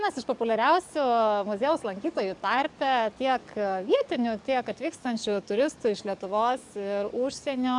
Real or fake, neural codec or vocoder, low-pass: fake; codec, 44.1 kHz, 7.8 kbps, DAC; 10.8 kHz